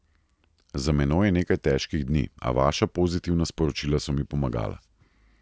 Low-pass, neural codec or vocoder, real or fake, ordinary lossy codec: none; none; real; none